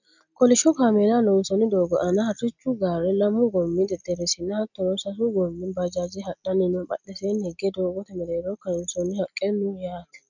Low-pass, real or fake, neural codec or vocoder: 7.2 kHz; real; none